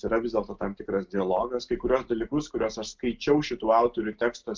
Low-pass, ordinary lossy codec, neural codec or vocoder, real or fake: 7.2 kHz; Opus, 24 kbps; none; real